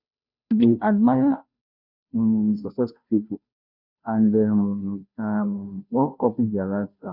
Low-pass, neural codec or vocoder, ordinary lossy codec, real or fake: 5.4 kHz; codec, 16 kHz, 0.5 kbps, FunCodec, trained on Chinese and English, 25 frames a second; Opus, 64 kbps; fake